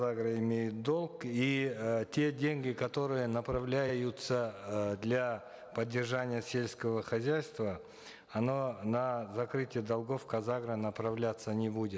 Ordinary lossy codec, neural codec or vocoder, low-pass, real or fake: none; none; none; real